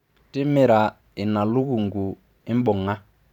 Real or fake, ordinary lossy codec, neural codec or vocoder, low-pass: fake; none; vocoder, 44.1 kHz, 128 mel bands every 512 samples, BigVGAN v2; 19.8 kHz